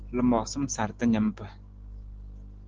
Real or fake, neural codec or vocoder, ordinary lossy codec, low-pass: real; none; Opus, 16 kbps; 7.2 kHz